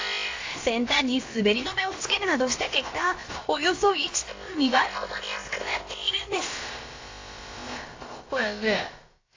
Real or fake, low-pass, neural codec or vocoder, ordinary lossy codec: fake; 7.2 kHz; codec, 16 kHz, about 1 kbps, DyCAST, with the encoder's durations; AAC, 32 kbps